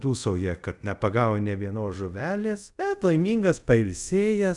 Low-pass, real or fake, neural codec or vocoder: 10.8 kHz; fake; codec, 24 kHz, 0.5 kbps, DualCodec